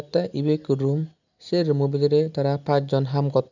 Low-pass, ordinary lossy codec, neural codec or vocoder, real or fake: 7.2 kHz; none; none; real